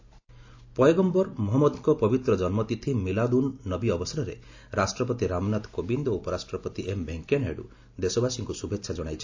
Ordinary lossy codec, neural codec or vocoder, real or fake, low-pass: AAC, 48 kbps; none; real; 7.2 kHz